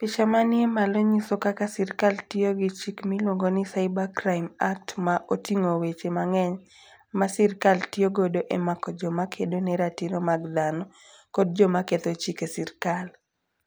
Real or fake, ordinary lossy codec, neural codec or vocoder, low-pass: real; none; none; none